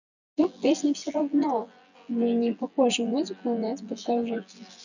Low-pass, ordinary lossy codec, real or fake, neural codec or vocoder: 7.2 kHz; none; fake; vocoder, 24 kHz, 100 mel bands, Vocos